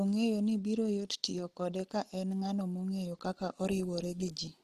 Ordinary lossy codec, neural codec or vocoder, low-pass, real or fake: Opus, 16 kbps; none; 14.4 kHz; real